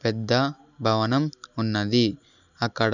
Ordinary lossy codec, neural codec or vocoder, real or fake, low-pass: none; none; real; 7.2 kHz